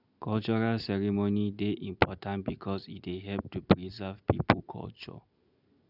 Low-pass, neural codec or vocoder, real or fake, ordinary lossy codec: 5.4 kHz; none; real; none